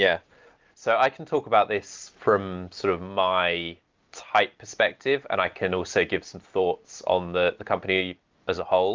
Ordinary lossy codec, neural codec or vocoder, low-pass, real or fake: Opus, 24 kbps; none; 7.2 kHz; real